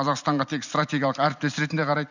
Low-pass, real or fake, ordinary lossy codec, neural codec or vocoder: 7.2 kHz; real; none; none